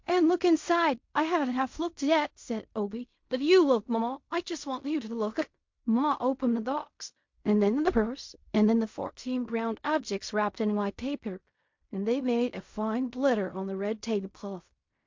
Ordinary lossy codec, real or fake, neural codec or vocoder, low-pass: MP3, 48 kbps; fake; codec, 16 kHz in and 24 kHz out, 0.4 kbps, LongCat-Audio-Codec, fine tuned four codebook decoder; 7.2 kHz